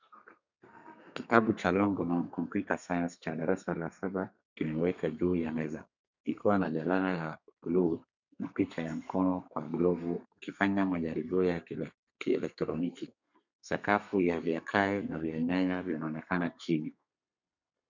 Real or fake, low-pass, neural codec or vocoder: fake; 7.2 kHz; codec, 32 kHz, 1.9 kbps, SNAC